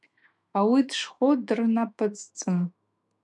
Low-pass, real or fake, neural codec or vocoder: 10.8 kHz; fake; autoencoder, 48 kHz, 128 numbers a frame, DAC-VAE, trained on Japanese speech